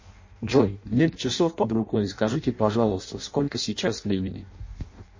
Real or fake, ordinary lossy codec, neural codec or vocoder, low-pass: fake; MP3, 32 kbps; codec, 16 kHz in and 24 kHz out, 0.6 kbps, FireRedTTS-2 codec; 7.2 kHz